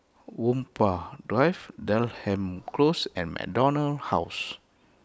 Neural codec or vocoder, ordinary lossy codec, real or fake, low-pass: none; none; real; none